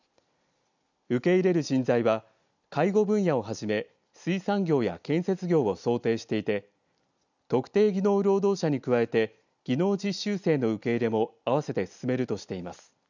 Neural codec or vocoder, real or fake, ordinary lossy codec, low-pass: none; real; none; 7.2 kHz